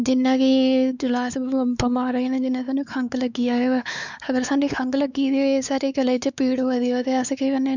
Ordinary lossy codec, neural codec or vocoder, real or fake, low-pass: none; codec, 16 kHz, 4 kbps, X-Codec, WavLM features, trained on Multilingual LibriSpeech; fake; 7.2 kHz